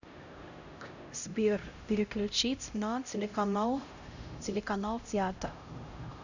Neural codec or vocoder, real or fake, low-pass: codec, 16 kHz, 0.5 kbps, X-Codec, HuBERT features, trained on LibriSpeech; fake; 7.2 kHz